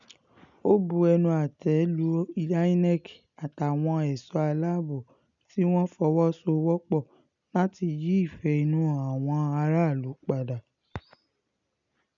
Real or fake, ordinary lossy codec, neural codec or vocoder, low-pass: real; none; none; 7.2 kHz